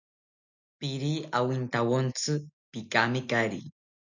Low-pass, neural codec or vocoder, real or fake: 7.2 kHz; none; real